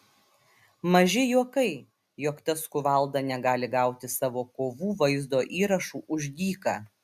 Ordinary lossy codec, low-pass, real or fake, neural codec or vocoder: MP3, 96 kbps; 14.4 kHz; real; none